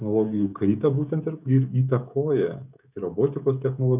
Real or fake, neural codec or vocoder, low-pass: fake; codec, 16 kHz, 16 kbps, FreqCodec, smaller model; 3.6 kHz